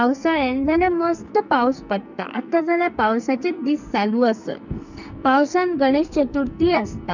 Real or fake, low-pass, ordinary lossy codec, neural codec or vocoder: fake; 7.2 kHz; none; codec, 44.1 kHz, 2.6 kbps, SNAC